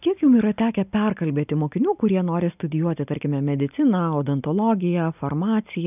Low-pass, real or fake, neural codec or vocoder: 3.6 kHz; real; none